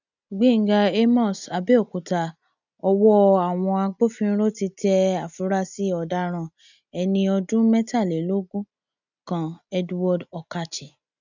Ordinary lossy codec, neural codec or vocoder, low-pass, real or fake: none; none; 7.2 kHz; real